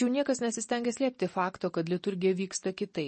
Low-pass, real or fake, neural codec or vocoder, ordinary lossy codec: 10.8 kHz; real; none; MP3, 32 kbps